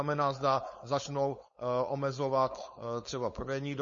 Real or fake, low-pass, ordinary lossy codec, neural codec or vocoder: fake; 7.2 kHz; MP3, 32 kbps; codec, 16 kHz, 4.8 kbps, FACodec